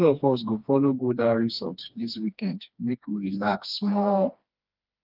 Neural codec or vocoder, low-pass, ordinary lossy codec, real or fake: codec, 16 kHz, 2 kbps, FreqCodec, smaller model; 5.4 kHz; Opus, 24 kbps; fake